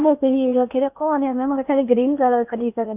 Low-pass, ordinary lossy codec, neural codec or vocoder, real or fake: 3.6 kHz; none; codec, 16 kHz in and 24 kHz out, 0.8 kbps, FocalCodec, streaming, 65536 codes; fake